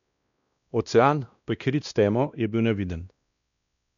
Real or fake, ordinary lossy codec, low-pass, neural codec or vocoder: fake; none; 7.2 kHz; codec, 16 kHz, 1 kbps, X-Codec, WavLM features, trained on Multilingual LibriSpeech